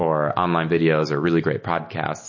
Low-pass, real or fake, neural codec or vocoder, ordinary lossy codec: 7.2 kHz; real; none; MP3, 32 kbps